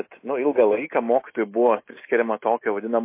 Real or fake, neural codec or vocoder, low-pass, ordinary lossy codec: real; none; 3.6 kHz; MP3, 24 kbps